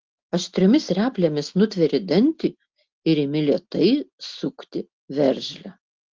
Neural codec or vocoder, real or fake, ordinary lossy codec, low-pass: none; real; Opus, 16 kbps; 7.2 kHz